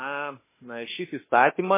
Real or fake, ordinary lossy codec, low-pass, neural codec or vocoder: fake; MP3, 16 kbps; 3.6 kHz; autoencoder, 48 kHz, 32 numbers a frame, DAC-VAE, trained on Japanese speech